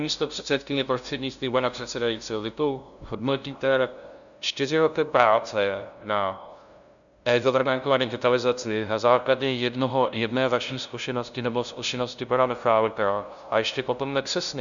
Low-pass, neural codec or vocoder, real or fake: 7.2 kHz; codec, 16 kHz, 0.5 kbps, FunCodec, trained on LibriTTS, 25 frames a second; fake